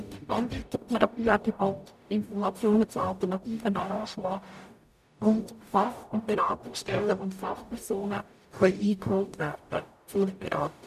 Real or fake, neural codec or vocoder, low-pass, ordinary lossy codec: fake; codec, 44.1 kHz, 0.9 kbps, DAC; 14.4 kHz; none